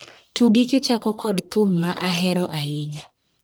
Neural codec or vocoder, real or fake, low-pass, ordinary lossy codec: codec, 44.1 kHz, 1.7 kbps, Pupu-Codec; fake; none; none